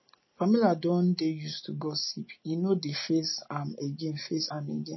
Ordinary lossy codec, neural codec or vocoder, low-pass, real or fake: MP3, 24 kbps; none; 7.2 kHz; real